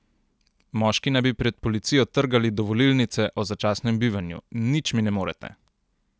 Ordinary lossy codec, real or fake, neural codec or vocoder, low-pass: none; real; none; none